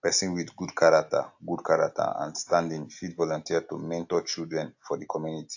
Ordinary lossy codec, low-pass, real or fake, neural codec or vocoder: AAC, 48 kbps; 7.2 kHz; real; none